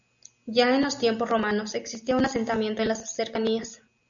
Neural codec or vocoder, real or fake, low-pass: none; real; 7.2 kHz